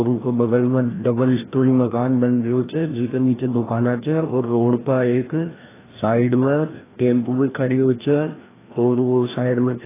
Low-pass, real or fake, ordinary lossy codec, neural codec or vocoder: 3.6 kHz; fake; AAC, 16 kbps; codec, 16 kHz, 1 kbps, FreqCodec, larger model